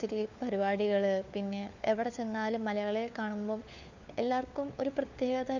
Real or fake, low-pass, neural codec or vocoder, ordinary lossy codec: fake; 7.2 kHz; codec, 16 kHz, 4 kbps, FunCodec, trained on LibriTTS, 50 frames a second; none